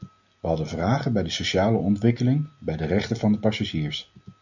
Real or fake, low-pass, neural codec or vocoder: real; 7.2 kHz; none